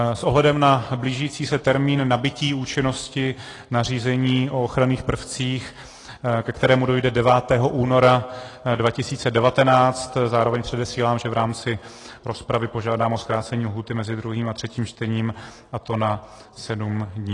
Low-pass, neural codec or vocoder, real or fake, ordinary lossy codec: 10.8 kHz; none; real; AAC, 32 kbps